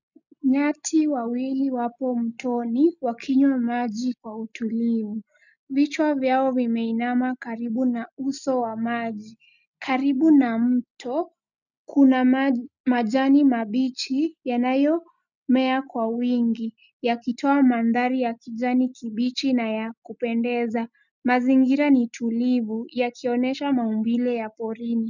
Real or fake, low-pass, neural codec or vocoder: real; 7.2 kHz; none